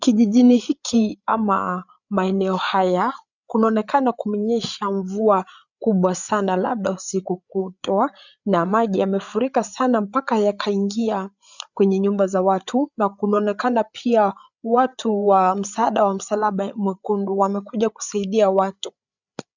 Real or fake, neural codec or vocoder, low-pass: fake; codec, 16 kHz, 8 kbps, FreqCodec, larger model; 7.2 kHz